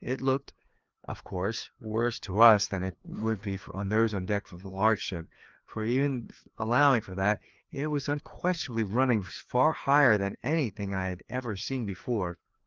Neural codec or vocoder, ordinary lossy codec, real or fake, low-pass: codec, 16 kHz, 2 kbps, FreqCodec, larger model; Opus, 32 kbps; fake; 7.2 kHz